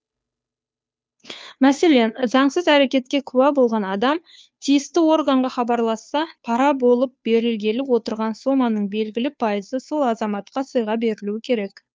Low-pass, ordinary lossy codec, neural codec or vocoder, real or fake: none; none; codec, 16 kHz, 2 kbps, FunCodec, trained on Chinese and English, 25 frames a second; fake